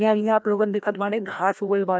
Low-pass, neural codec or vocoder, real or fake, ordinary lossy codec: none; codec, 16 kHz, 1 kbps, FreqCodec, larger model; fake; none